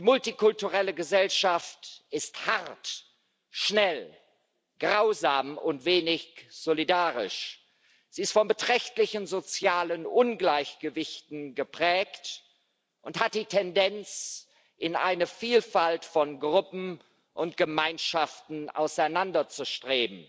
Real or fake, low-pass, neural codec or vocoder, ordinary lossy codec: real; none; none; none